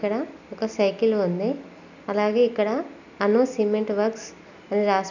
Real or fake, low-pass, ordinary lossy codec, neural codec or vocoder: real; 7.2 kHz; none; none